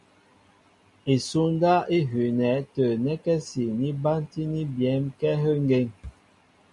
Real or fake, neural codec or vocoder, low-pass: real; none; 10.8 kHz